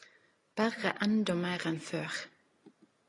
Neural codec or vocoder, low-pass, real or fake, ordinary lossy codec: none; 10.8 kHz; real; AAC, 32 kbps